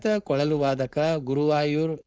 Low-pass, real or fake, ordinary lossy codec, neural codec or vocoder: none; fake; none; codec, 16 kHz, 4.8 kbps, FACodec